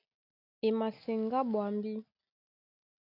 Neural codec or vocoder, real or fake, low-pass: none; real; 5.4 kHz